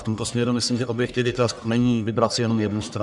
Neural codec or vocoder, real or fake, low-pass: codec, 44.1 kHz, 1.7 kbps, Pupu-Codec; fake; 10.8 kHz